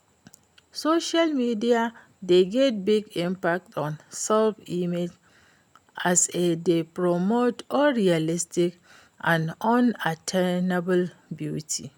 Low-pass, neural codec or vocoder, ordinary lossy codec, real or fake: none; none; none; real